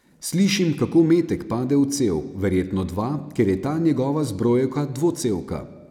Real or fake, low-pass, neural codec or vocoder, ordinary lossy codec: real; 19.8 kHz; none; none